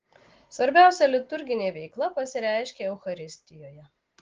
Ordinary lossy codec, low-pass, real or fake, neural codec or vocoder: Opus, 16 kbps; 7.2 kHz; real; none